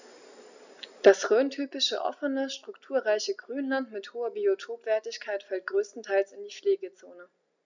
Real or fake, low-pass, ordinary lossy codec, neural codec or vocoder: real; 7.2 kHz; none; none